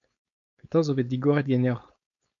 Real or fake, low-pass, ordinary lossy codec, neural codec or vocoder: fake; 7.2 kHz; AAC, 64 kbps; codec, 16 kHz, 4.8 kbps, FACodec